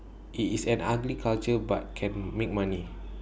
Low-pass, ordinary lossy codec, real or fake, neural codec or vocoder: none; none; real; none